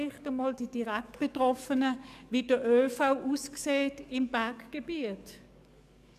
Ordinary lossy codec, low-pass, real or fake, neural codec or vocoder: none; 14.4 kHz; fake; codec, 44.1 kHz, 7.8 kbps, DAC